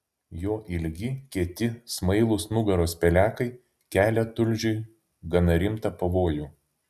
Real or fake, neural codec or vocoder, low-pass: real; none; 14.4 kHz